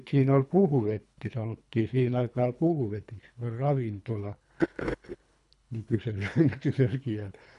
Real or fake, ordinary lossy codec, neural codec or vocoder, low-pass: fake; none; codec, 24 kHz, 3 kbps, HILCodec; 10.8 kHz